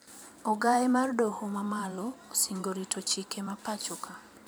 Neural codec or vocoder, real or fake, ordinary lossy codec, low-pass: vocoder, 44.1 kHz, 128 mel bands every 512 samples, BigVGAN v2; fake; none; none